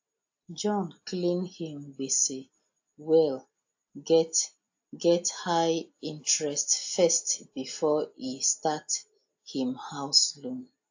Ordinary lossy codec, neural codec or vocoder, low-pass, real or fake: AAC, 48 kbps; none; 7.2 kHz; real